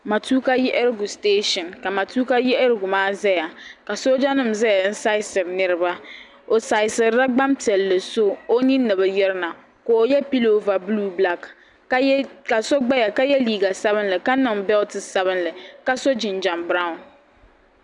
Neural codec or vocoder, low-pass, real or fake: none; 10.8 kHz; real